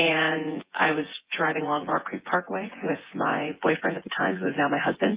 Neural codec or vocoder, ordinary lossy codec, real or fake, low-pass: vocoder, 24 kHz, 100 mel bands, Vocos; Opus, 32 kbps; fake; 3.6 kHz